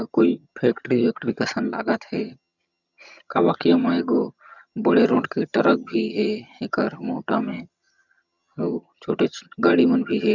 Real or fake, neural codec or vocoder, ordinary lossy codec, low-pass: fake; vocoder, 22.05 kHz, 80 mel bands, HiFi-GAN; none; 7.2 kHz